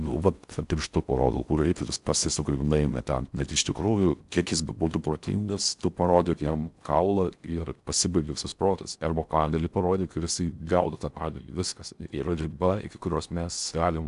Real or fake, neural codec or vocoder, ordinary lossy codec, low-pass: fake; codec, 16 kHz in and 24 kHz out, 0.6 kbps, FocalCodec, streaming, 4096 codes; MP3, 96 kbps; 10.8 kHz